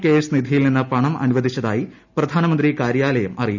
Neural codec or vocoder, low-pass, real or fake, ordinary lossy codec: none; 7.2 kHz; real; none